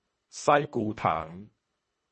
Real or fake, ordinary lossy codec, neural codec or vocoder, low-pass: fake; MP3, 32 kbps; codec, 24 kHz, 1.5 kbps, HILCodec; 10.8 kHz